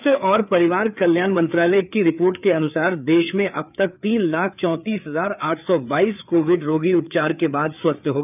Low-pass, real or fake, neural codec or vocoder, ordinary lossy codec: 3.6 kHz; fake; codec, 16 kHz, 4 kbps, FreqCodec, larger model; none